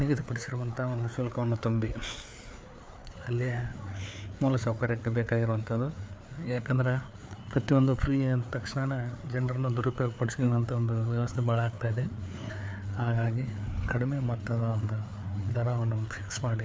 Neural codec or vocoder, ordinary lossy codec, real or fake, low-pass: codec, 16 kHz, 4 kbps, FreqCodec, larger model; none; fake; none